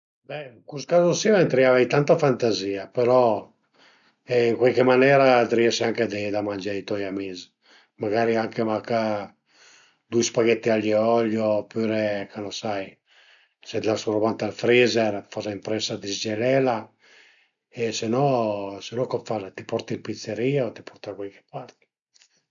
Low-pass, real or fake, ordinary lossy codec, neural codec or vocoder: 7.2 kHz; real; none; none